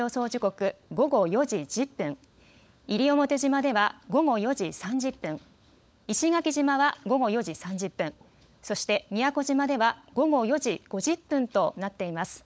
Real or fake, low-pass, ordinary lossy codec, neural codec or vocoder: fake; none; none; codec, 16 kHz, 16 kbps, FunCodec, trained on LibriTTS, 50 frames a second